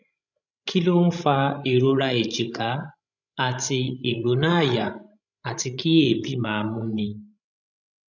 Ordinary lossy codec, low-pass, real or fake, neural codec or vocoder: none; 7.2 kHz; fake; codec, 16 kHz, 16 kbps, FreqCodec, larger model